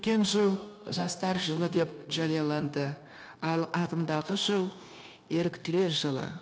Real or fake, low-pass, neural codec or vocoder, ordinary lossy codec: fake; none; codec, 16 kHz, 0.9 kbps, LongCat-Audio-Codec; none